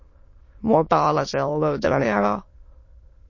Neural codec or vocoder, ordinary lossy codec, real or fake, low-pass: autoencoder, 22.05 kHz, a latent of 192 numbers a frame, VITS, trained on many speakers; MP3, 32 kbps; fake; 7.2 kHz